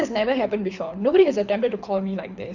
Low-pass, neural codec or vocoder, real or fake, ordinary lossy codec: 7.2 kHz; codec, 24 kHz, 6 kbps, HILCodec; fake; none